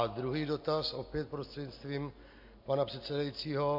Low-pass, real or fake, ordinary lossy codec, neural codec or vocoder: 5.4 kHz; real; MP3, 32 kbps; none